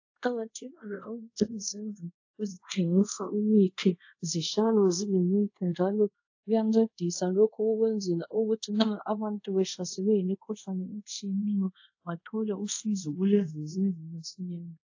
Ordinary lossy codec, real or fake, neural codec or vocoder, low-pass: AAC, 48 kbps; fake; codec, 24 kHz, 0.5 kbps, DualCodec; 7.2 kHz